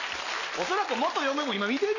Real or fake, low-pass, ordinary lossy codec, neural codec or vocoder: real; 7.2 kHz; none; none